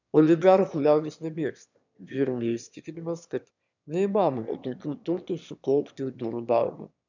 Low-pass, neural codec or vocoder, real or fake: 7.2 kHz; autoencoder, 22.05 kHz, a latent of 192 numbers a frame, VITS, trained on one speaker; fake